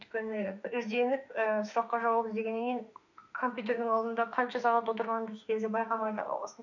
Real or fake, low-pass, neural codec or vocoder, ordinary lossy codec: fake; 7.2 kHz; autoencoder, 48 kHz, 32 numbers a frame, DAC-VAE, trained on Japanese speech; none